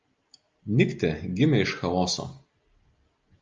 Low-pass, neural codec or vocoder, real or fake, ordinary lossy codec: 7.2 kHz; none; real; Opus, 24 kbps